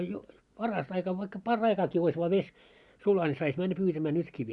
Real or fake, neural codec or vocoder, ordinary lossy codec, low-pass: real; none; Opus, 64 kbps; 10.8 kHz